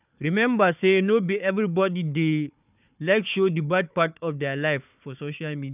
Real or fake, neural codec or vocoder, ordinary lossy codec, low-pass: fake; codec, 16 kHz, 4 kbps, FunCodec, trained on Chinese and English, 50 frames a second; none; 3.6 kHz